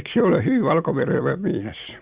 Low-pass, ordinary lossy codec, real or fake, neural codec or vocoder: 3.6 kHz; Opus, 64 kbps; real; none